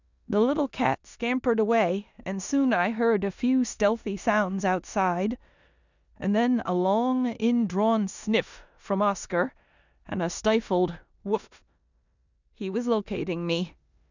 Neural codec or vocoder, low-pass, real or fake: codec, 16 kHz in and 24 kHz out, 0.9 kbps, LongCat-Audio-Codec, four codebook decoder; 7.2 kHz; fake